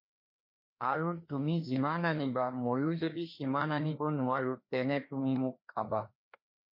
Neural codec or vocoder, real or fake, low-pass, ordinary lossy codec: codec, 16 kHz, 2 kbps, FreqCodec, larger model; fake; 5.4 kHz; MP3, 32 kbps